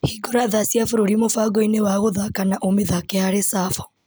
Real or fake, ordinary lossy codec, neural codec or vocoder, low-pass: real; none; none; none